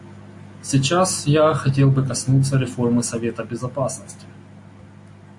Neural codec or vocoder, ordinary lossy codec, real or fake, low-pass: none; MP3, 48 kbps; real; 10.8 kHz